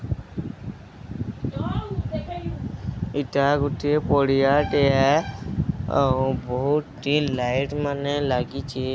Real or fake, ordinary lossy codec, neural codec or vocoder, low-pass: real; none; none; none